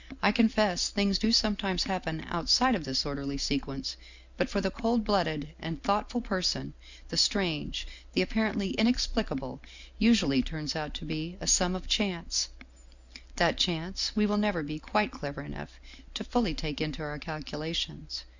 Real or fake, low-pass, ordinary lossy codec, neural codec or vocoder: real; 7.2 kHz; Opus, 64 kbps; none